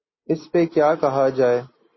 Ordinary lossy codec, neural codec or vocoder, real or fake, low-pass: MP3, 24 kbps; none; real; 7.2 kHz